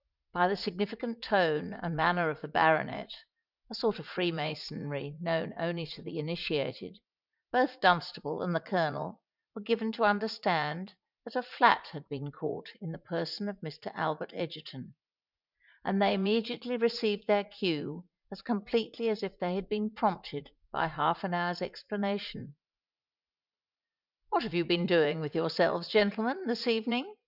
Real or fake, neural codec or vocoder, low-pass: fake; vocoder, 44.1 kHz, 80 mel bands, Vocos; 5.4 kHz